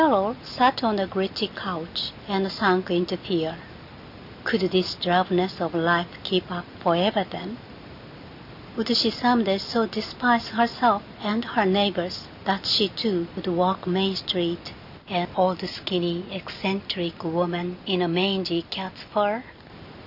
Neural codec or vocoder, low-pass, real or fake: none; 5.4 kHz; real